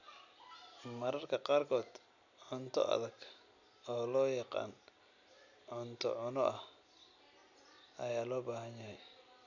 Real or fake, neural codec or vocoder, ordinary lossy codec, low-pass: real; none; none; 7.2 kHz